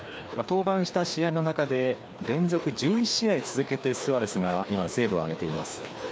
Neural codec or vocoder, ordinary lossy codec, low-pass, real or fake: codec, 16 kHz, 2 kbps, FreqCodec, larger model; none; none; fake